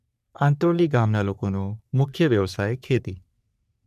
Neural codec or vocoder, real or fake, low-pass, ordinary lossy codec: codec, 44.1 kHz, 3.4 kbps, Pupu-Codec; fake; 14.4 kHz; none